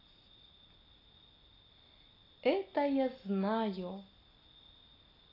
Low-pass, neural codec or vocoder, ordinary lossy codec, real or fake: 5.4 kHz; none; AAC, 24 kbps; real